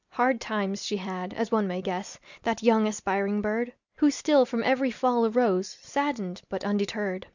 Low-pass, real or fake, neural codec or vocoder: 7.2 kHz; real; none